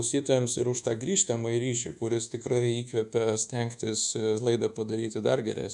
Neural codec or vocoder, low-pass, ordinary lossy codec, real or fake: codec, 24 kHz, 1.2 kbps, DualCodec; 10.8 kHz; MP3, 96 kbps; fake